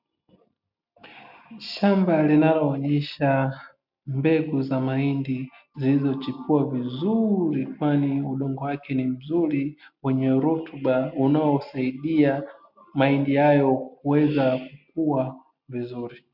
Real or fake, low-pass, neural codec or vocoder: real; 5.4 kHz; none